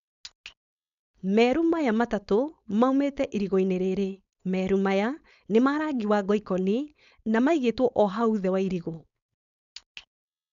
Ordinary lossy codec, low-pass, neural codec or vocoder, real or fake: none; 7.2 kHz; codec, 16 kHz, 4.8 kbps, FACodec; fake